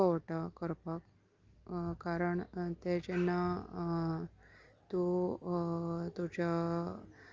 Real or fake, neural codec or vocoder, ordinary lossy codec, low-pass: real; none; Opus, 32 kbps; 7.2 kHz